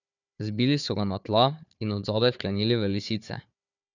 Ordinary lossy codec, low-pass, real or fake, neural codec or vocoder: none; 7.2 kHz; fake; codec, 16 kHz, 4 kbps, FunCodec, trained on Chinese and English, 50 frames a second